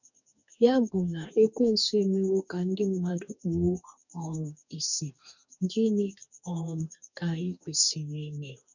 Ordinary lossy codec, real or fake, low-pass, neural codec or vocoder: none; fake; 7.2 kHz; codec, 44.1 kHz, 2.6 kbps, DAC